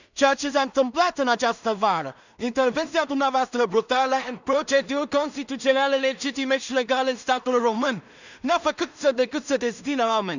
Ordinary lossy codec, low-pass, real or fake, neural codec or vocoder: none; 7.2 kHz; fake; codec, 16 kHz in and 24 kHz out, 0.4 kbps, LongCat-Audio-Codec, two codebook decoder